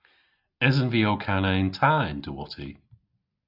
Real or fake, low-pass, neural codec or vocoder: real; 5.4 kHz; none